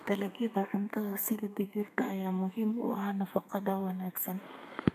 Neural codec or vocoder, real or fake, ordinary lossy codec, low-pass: codec, 32 kHz, 1.9 kbps, SNAC; fake; none; 14.4 kHz